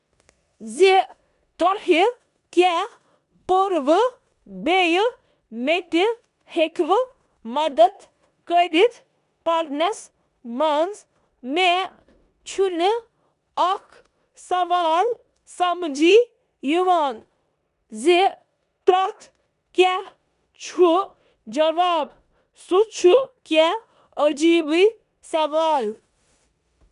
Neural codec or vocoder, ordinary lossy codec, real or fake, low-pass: codec, 16 kHz in and 24 kHz out, 0.9 kbps, LongCat-Audio-Codec, four codebook decoder; none; fake; 10.8 kHz